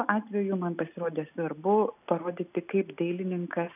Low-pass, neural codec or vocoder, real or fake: 3.6 kHz; none; real